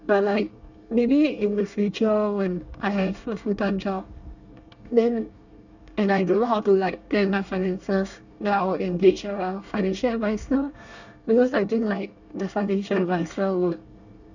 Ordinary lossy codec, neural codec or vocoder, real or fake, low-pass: none; codec, 24 kHz, 1 kbps, SNAC; fake; 7.2 kHz